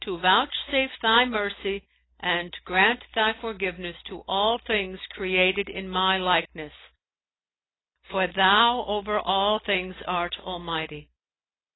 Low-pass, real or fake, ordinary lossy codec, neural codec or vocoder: 7.2 kHz; real; AAC, 16 kbps; none